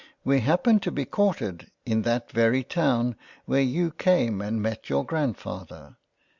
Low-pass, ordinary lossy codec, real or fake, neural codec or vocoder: 7.2 kHz; Opus, 64 kbps; real; none